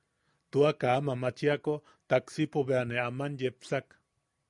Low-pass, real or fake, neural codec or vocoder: 10.8 kHz; real; none